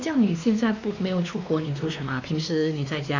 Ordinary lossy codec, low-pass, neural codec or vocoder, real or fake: AAC, 48 kbps; 7.2 kHz; codec, 16 kHz, 2 kbps, X-Codec, WavLM features, trained on Multilingual LibriSpeech; fake